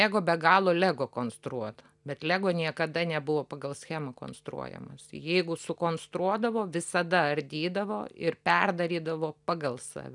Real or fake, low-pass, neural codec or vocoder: real; 10.8 kHz; none